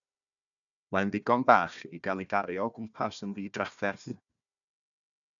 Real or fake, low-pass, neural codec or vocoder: fake; 7.2 kHz; codec, 16 kHz, 1 kbps, FunCodec, trained on Chinese and English, 50 frames a second